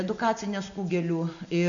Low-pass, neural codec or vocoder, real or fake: 7.2 kHz; none; real